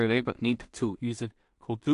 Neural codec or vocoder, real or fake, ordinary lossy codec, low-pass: codec, 16 kHz in and 24 kHz out, 0.4 kbps, LongCat-Audio-Codec, two codebook decoder; fake; AAC, 64 kbps; 10.8 kHz